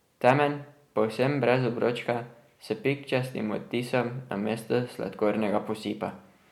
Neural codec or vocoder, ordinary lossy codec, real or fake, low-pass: none; MP3, 96 kbps; real; 19.8 kHz